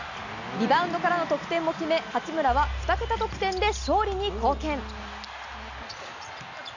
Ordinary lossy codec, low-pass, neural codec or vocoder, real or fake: none; 7.2 kHz; none; real